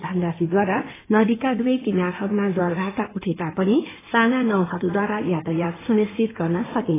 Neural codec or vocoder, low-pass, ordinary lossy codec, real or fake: codec, 16 kHz in and 24 kHz out, 2.2 kbps, FireRedTTS-2 codec; 3.6 kHz; AAC, 16 kbps; fake